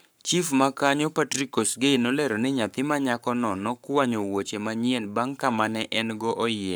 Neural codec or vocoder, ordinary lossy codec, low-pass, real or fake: codec, 44.1 kHz, 7.8 kbps, Pupu-Codec; none; none; fake